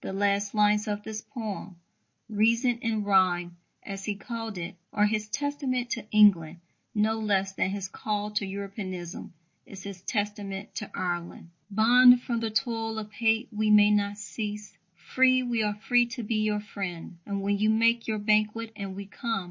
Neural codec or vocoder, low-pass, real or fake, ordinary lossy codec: none; 7.2 kHz; real; MP3, 32 kbps